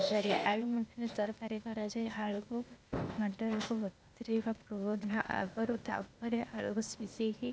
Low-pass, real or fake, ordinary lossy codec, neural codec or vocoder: none; fake; none; codec, 16 kHz, 0.8 kbps, ZipCodec